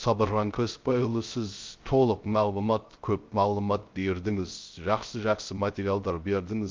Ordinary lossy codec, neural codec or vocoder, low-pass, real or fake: Opus, 24 kbps; codec, 16 kHz, 0.3 kbps, FocalCodec; 7.2 kHz; fake